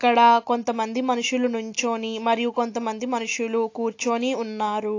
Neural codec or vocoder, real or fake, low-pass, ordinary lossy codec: none; real; 7.2 kHz; AAC, 48 kbps